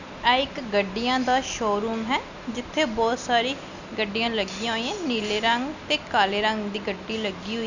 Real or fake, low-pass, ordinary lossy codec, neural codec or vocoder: real; 7.2 kHz; none; none